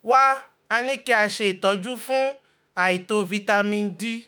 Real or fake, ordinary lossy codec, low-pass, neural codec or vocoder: fake; none; none; autoencoder, 48 kHz, 32 numbers a frame, DAC-VAE, trained on Japanese speech